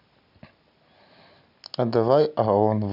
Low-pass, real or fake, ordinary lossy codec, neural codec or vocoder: 5.4 kHz; real; none; none